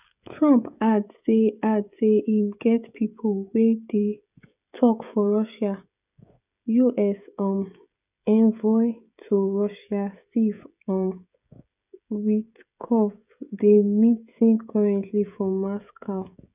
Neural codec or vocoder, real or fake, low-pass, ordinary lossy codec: codec, 16 kHz, 16 kbps, FreqCodec, smaller model; fake; 3.6 kHz; none